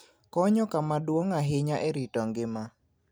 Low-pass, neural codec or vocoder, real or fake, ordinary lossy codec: none; none; real; none